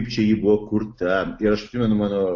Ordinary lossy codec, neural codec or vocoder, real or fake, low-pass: AAC, 48 kbps; none; real; 7.2 kHz